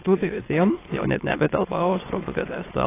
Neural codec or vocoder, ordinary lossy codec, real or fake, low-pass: autoencoder, 22.05 kHz, a latent of 192 numbers a frame, VITS, trained on many speakers; AAC, 16 kbps; fake; 3.6 kHz